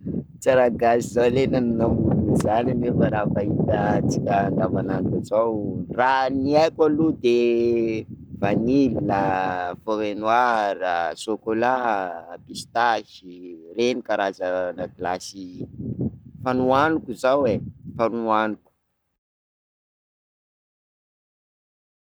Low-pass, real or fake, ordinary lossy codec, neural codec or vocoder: none; fake; none; codec, 44.1 kHz, 7.8 kbps, Pupu-Codec